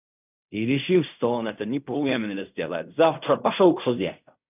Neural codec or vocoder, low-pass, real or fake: codec, 16 kHz in and 24 kHz out, 0.4 kbps, LongCat-Audio-Codec, fine tuned four codebook decoder; 3.6 kHz; fake